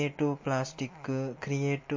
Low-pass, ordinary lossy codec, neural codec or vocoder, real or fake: 7.2 kHz; MP3, 32 kbps; none; real